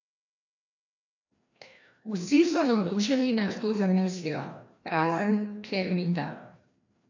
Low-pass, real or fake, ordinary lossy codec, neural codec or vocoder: 7.2 kHz; fake; AAC, 48 kbps; codec, 16 kHz, 1 kbps, FreqCodec, larger model